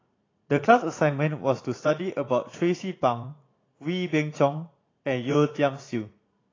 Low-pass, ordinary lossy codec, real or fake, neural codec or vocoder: 7.2 kHz; AAC, 32 kbps; fake; vocoder, 44.1 kHz, 80 mel bands, Vocos